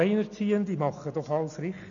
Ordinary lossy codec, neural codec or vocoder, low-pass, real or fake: none; none; 7.2 kHz; real